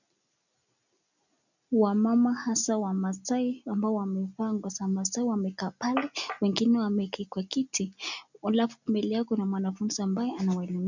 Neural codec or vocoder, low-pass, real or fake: none; 7.2 kHz; real